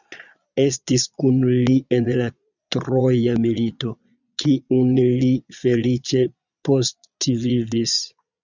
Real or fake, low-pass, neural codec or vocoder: fake; 7.2 kHz; vocoder, 22.05 kHz, 80 mel bands, Vocos